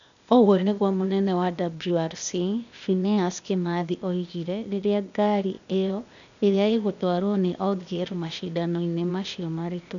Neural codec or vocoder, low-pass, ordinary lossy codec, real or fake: codec, 16 kHz, 0.8 kbps, ZipCodec; 7.2 kHz; none; fake